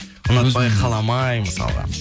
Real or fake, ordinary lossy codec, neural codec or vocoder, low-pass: real; none; none; none